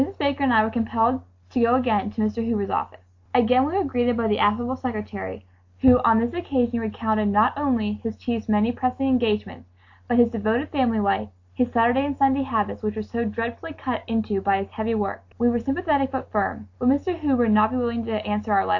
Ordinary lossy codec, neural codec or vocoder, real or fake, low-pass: AAC, 48 kbps; none; real; 7.2 kHz